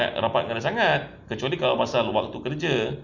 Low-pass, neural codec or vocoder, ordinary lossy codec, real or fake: 7.2 kHz; none; none; real